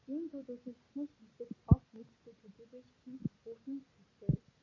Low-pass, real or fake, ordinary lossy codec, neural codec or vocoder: 7.2 kHz; real; MP3, 32 kbps; none